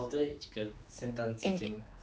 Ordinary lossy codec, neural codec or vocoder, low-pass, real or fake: none; codec, 16 kHz, 2 kbps, X-Codec, HuBERT features, trained on general audio; none; fake